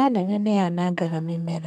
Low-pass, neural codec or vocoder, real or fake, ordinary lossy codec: 14.4 kHz; codec, 32 kHz, 1.9 kbps, SNAC; fake; none